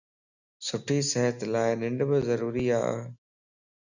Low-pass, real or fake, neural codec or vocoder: 7.2 kHz; real; none